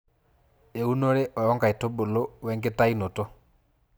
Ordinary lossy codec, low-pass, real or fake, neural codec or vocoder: none; none; real; none